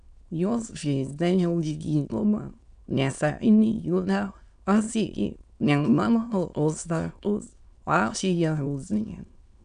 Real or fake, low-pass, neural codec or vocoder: fake; 9.9 kHz; autoencoder, 22.05 kHz, a latent of 192 numbers a frame, VITS, trained on many speakers